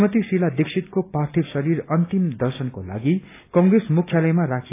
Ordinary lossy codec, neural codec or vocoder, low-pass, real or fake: AAC, 24 kbps; none; 3.6 kHz; real